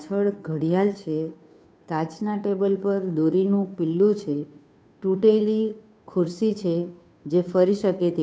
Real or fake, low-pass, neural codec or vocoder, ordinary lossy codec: fake; none; codec, 16 kHz, 2 kbps, FunCodec, trained on Chinese and English, 25 frames a second; none